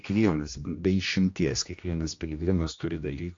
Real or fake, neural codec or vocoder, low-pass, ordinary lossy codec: fake; codec, 16 kHz, 1 kbps, X-Codec, HuBERT features, trained on general audio; 7.2 kHz; AAC, 48 kbps